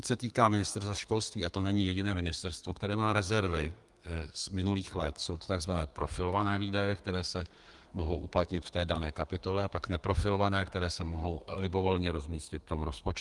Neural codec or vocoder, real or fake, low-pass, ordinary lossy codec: codec, 32 kHz, 1.9 kbps, SNAC; fake; 10.8 kHz; Opus, 32 kbps